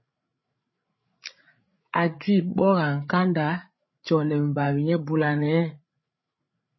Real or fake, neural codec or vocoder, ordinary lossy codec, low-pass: fake; codec, 16 kHz, 8 kbps, FreqCodec, larger model; MP3, 24 kbps; 7.2 kHz